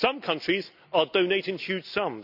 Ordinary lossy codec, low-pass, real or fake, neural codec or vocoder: none; 5.4 kHz; real; none